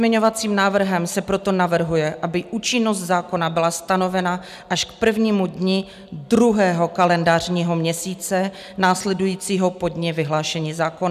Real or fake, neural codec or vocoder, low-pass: real; none; 14.4 kHz